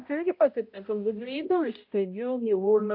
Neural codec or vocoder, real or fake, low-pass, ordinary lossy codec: codec, 16 kHz, 0.5 kbps, X-Codec, HuBERT features, trained on balanced general audio; fake; 5.4 kHz; AAC, 48 kbps